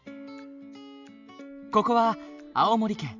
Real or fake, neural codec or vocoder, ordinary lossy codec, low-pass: real; none; none; 7.2 kHz